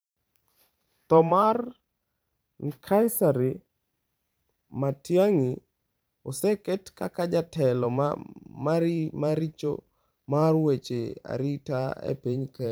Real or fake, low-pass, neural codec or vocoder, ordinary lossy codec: fake; none; vocoder, 44.1 kHz, 128 mel bands every 512 samples, BigVGAN v2; none